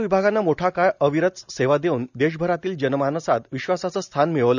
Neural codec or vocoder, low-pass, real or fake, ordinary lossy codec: none; 7.2 kHz; real; none